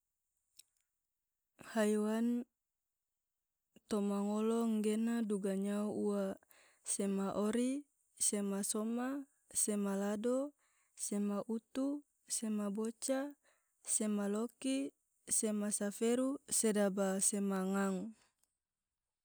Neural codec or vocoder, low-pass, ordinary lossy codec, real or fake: none; none; none; real